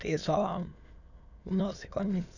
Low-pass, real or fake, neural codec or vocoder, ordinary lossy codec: 7.2 kHz; fake; autoencoder, 22.05 kHz, a latent of 192 numbers a frame, VITS, trained on many speakers; none